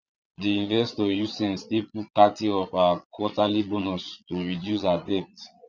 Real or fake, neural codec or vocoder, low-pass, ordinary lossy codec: fake; vocoder, 22.05 kHz, 80 mel bands, Vocos; 7.2 kHz; none